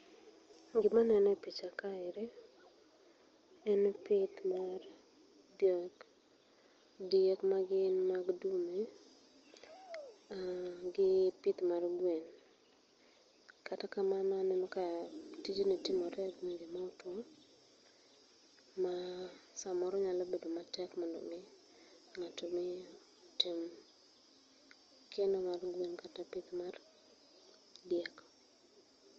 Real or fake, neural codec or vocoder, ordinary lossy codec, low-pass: real; none; Opus, 24 kbps; 7.2 kHz